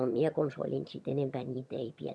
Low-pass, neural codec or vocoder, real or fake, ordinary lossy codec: none; vocoder, 22.05 kHz, 80 mel bands, HiFi-GAN; fake; none